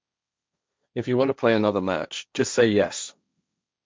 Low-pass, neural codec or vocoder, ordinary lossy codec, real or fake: none; codec, 16 kHz, 1.1 kbps, Voila-Tokenizer; none; fake